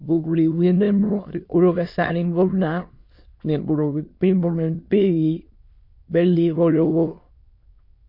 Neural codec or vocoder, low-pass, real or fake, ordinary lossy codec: autoencoder, 22.05 kHz, a latent of 192 numbers a frame, VITS, trained on many speakers; 5.4 kHz; fake; MP3, 32 kbps